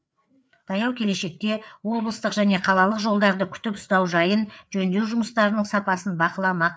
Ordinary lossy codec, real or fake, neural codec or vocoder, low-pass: none; fake; codec, 16 kHz, 4 kbps, FreqCodec, larger model; none